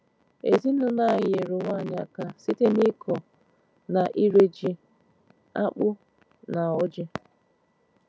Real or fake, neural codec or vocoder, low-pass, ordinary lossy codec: real; none; none; none